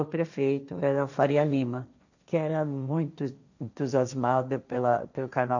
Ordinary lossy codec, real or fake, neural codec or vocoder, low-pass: none; fake; codec, 16 kHz, 1.1 kbps, Voila-Tokenizer; 7.2 kHz